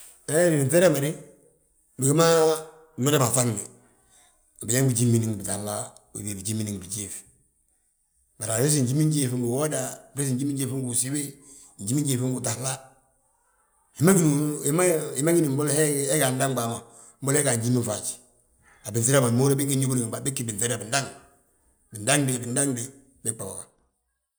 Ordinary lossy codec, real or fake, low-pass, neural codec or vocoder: none; real; none; none